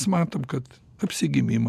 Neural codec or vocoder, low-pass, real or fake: none; 14.4 kHz; real